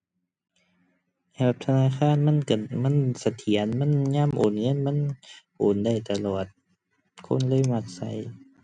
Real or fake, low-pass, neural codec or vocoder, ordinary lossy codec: real; 9.9 kHz; none; none